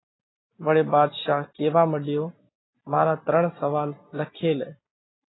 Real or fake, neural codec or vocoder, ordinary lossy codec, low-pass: real; none; AAC, 16 kbps; 7.2 kHz